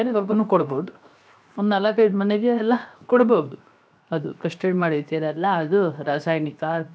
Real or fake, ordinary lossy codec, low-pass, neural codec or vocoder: fake; none; none; codec, 16 kHz, 0.7 kbps, FocalCodec